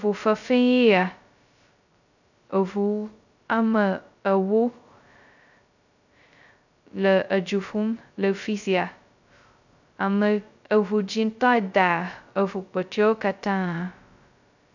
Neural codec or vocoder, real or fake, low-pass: codec, 16 kHz, 0.2 kbps, FocalCodec; fake; 7.2 kHz